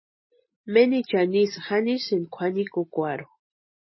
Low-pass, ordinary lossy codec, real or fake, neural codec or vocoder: 7.2 kHz; MP3, 24 kbps; real; none